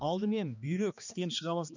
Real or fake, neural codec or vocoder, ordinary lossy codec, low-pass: fake; codec, 16 kHz, 2 kbps, X-Codec, HuBERT features, trained on balanced general audio; none; 7.2 kHz